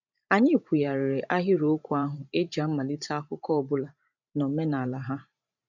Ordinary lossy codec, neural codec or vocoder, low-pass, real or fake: none; vocoder, 44.1 kHz, 128 mel bands every 512 samples, BigVGAN v2; 7.2 kHz; fake